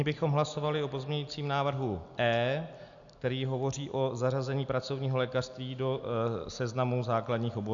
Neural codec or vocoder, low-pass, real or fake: none; 7.2 kHz; real